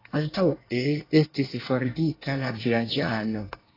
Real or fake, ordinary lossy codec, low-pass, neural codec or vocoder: fake; AAC, 24 kbps; 5.4 kHz; codec, 24 kHz, 1 kbps, SNAC